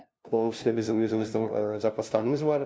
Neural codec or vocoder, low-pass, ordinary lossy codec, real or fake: codec, 16 kHz, 0.5 kbps, FunCodec, trained on LibriTTS, 25 frames a second; none; none; fake